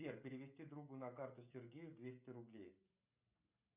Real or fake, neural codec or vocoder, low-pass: fake; codec, 16 kHz, 16 kbps, FreqCodec, smaller model; 3.6 kHz